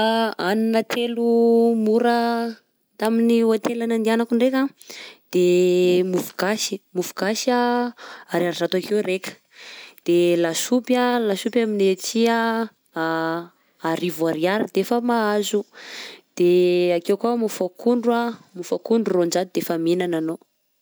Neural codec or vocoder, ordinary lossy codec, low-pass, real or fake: none; none; none; real